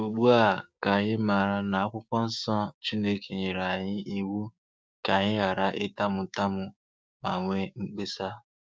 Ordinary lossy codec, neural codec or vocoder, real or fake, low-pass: none; codec, 16 kHz, 6 kbps, DAC; fake; none